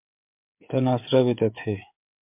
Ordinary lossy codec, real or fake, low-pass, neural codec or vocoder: MP3, 32 kbps; fake; 3.6 kHz; codec, 16 kHz, 8 kbps, FreqCodec, smaller model